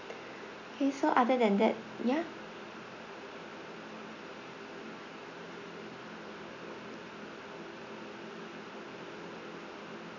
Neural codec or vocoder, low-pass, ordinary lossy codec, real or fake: none; 7.2 kHz; none; real